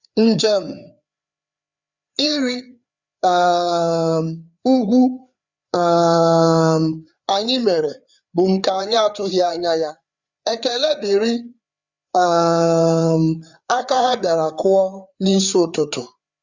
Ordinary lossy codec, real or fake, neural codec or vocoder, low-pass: Opus, 64 kbps; fake; codec, 16 kHz, 4 kbps, FreqCodec, larger model; 7.2 kHz